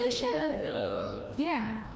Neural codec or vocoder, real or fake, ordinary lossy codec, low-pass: codec, 16 kHz, 1 kbps, FreqCodec, larger model; fake; none; none